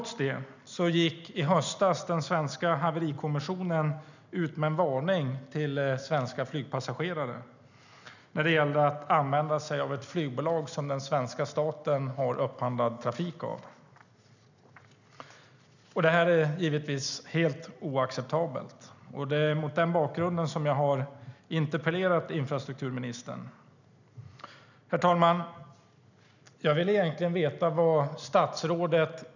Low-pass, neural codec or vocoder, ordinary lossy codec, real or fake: 7.2 kHz; none; none; real